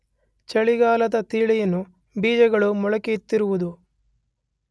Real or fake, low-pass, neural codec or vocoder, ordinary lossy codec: real; none; none; none